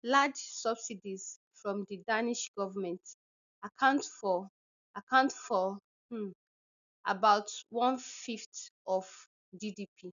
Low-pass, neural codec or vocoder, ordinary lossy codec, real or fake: 7.2 kHz; none; none; real